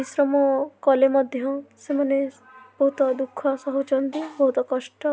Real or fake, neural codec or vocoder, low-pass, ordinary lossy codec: real; none; none; none